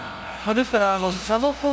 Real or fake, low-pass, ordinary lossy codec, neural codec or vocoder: fake; none; none; codec, 16 kHz, 0.5 kbps, FunCodec, trained on LibriTTS, 25 frames a second